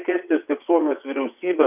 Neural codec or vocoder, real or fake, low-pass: vocoder, 22.05 kHz, 80 mel bands, WaveNeXt; fake; 3.6 kHz